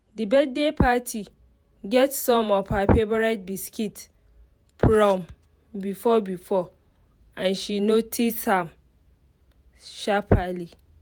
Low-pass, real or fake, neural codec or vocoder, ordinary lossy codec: none; fake; vocoder, 48 kHz, 128 mel bands, Vocos; none